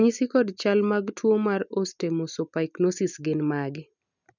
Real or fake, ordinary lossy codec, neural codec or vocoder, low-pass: real; none; none; 7.2 kHz